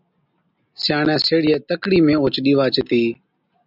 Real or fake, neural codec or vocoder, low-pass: real; none; 5.4 kHz